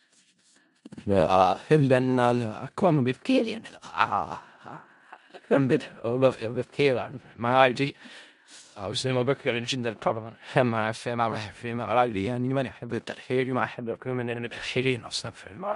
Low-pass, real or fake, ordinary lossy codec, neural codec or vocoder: 10.8 kHz; fake; MP3, 64 kbps; codec, 16 kHz in and 24 kHz out, 0.4 kbps, LongCat-Audio-Codec, four codebook decoder